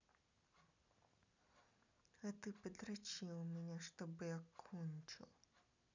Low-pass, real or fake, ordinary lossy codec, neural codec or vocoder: 7.2 kHz; real; none; none